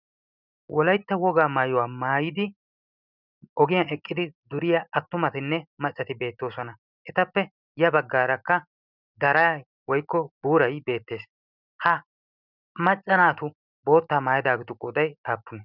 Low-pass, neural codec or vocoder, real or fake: 5.4 kHz; none; real